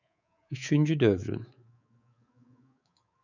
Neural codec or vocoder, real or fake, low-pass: codec, 24 kHz, 3.1 kbps, DualCodec; fake; 7.2 kHz